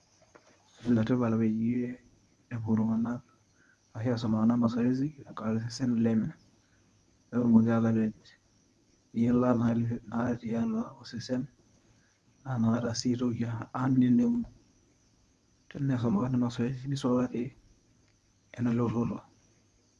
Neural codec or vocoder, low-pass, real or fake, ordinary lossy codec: codec, 24 kHz, 0.9 kbps, WavTokenizer, medium speech release version 1; none; fake; none